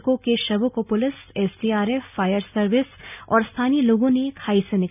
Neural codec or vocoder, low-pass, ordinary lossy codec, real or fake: none; 3.6 kHz; none; real